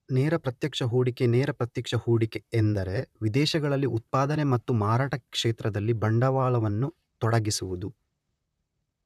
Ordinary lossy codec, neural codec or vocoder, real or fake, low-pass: none; vocoder, 44.1 kHz, 128 mel bands, Pupu-Vocoder; fake; 14.4 kHz